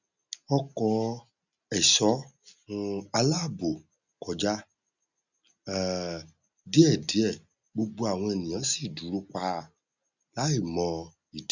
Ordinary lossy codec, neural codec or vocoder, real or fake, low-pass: none; none; real; 7.2 kHz